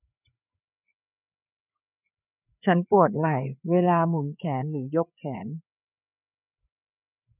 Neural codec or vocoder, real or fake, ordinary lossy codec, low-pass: codec, 16 kHz, 4 kbps, FreqCodec, larger model; fake; none; 3.6 kHz